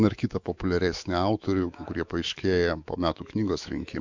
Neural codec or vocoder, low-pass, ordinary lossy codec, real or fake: autoencoder, 48 kHz, 128 numbers a frame, DAC-VAE, trained on Japanese speech; 7.2 kHz; MP3, 64 kbps; fake